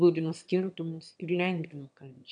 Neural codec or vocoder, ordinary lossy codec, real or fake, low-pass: autoencoder, 22.05 kHz, a latent of 192 numbers a frame, VITS, trained on one speaker; MP3, 96 kbps; fake; 9.9 kHz